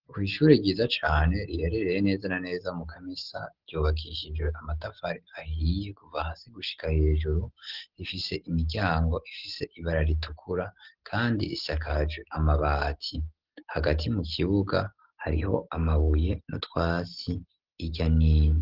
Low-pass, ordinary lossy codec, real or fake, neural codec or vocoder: 5.4 kHz; Opus, 16 kbps; real; none